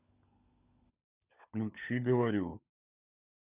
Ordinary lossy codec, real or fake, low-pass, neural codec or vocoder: Opus, 64 kbps; fake; 3.6 kHz; codec, 16 kHz, 16 kbps, FunCodec, trained on LibriTTS, 50 frames a second